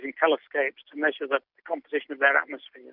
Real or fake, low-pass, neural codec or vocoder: real; 5.4 kHz; none